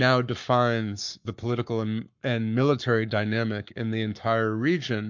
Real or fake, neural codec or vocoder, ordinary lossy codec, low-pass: fake; codec, 44.1 kHz, 7.8 kbps, Pupu-Codec; MP3, 64 kbps; 7.2 kHz